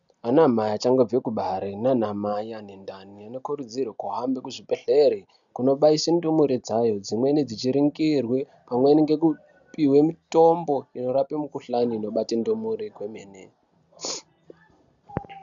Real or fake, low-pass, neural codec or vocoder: real; 7.2 kHz; none